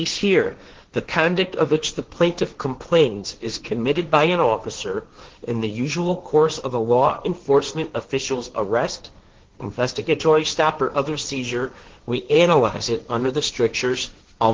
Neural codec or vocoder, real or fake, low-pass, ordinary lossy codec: codec, 16 kHz, 1.1 kbps, Voila-Tokenizer; fake; 7.2 kHz; Opus, 16 kbps